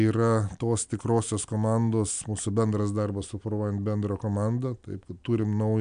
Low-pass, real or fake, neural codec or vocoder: 9.9 kHz; real; none